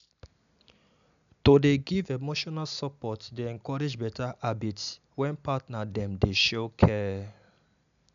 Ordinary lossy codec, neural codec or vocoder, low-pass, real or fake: none; none; 7.2 kHz; real